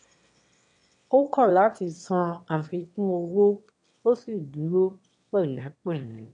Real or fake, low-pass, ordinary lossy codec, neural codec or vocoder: fake; 9.9 kHz; none; autoencoder, 22.05 kHz, a latent of 192 numbers a frame, VITS, trained on one speaker